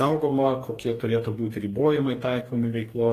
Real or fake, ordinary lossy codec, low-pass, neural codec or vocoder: fake; AAC, 48 kbps; 14.4 kHz; codec, 44.1 kHz, 2.6 kbps, DAC